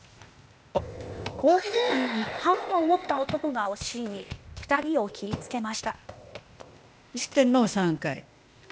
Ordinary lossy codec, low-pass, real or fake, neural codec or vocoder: none; none; fake; codec, 16 kHz, 0.8 kbps, ZipCodec